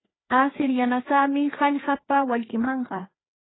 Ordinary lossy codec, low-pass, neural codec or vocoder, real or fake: AAC, 16 kbps; 7.2 kHz; codec, 16 kHz, 2 kbps, FreqCodec, larger model; fake